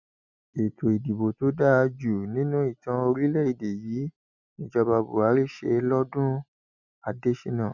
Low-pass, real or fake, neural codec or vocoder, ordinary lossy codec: 7.2 kHz; real; none; none